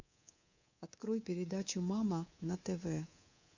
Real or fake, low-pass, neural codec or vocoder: fake; 7.2 kHz; codec, 24 kHz, 3.1 kbps, DualCodec